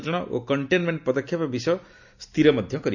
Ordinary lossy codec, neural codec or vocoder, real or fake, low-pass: none; none; real; 7.2 kHz